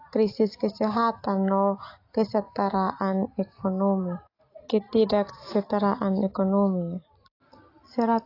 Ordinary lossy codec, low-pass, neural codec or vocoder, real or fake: AAC, 32 kbps; 5.4 kHz; none; real